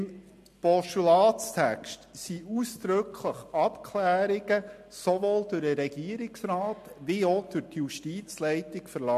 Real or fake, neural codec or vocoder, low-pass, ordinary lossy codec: real; none; 14.4 kHz; MP3, 64 kbps